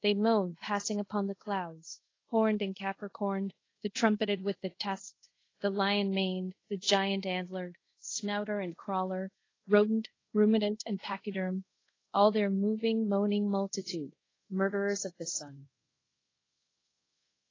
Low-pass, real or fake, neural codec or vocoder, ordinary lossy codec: 7.2 kHz; fake; codec, 24 kHz, 0.5 kbps, DualCodec; AAC, 32 kbps